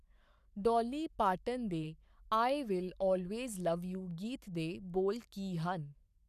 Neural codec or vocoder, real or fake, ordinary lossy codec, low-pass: codec, 44.1 kHz, 7.8 kbps, Pupu-Codec; fake; none; 14.4 kHz